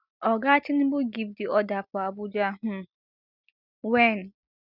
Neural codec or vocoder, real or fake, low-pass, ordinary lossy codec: none; real; 5.4 kHz; none